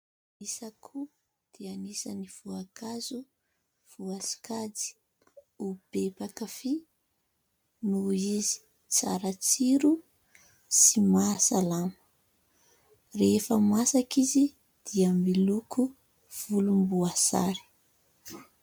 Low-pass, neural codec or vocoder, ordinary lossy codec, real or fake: 19.8 kHz; none; MP3, 96 kbps; real